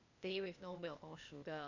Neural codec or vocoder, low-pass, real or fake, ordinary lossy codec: codec, 16 kHz, 0.8 kbps, ZipCodec; 7.2 kHz; fake; none